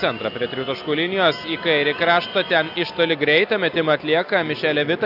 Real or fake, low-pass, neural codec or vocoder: real; 5.4 kHz; none